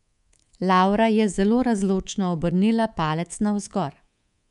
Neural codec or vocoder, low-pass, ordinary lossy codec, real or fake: codec, 24 kHz, 3.1 kbps, DualCodec; 10.8 kHz; none; fake